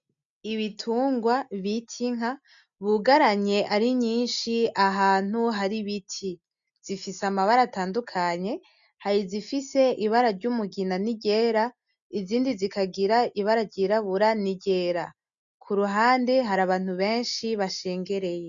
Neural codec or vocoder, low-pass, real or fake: none; 7.2 kHz; real